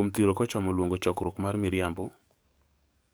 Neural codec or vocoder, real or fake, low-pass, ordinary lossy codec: codec, 44.1 kHz, 7.8 kbps, DAC; fake; none; none